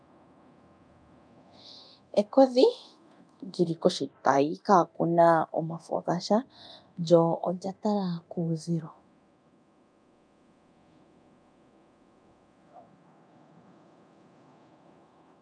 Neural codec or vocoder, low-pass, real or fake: codec, 24 kHz, 0.9 kbps, DualCodec; 9.9 kHz; fake